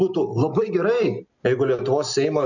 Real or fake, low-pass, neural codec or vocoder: real; 7.2 kHz; none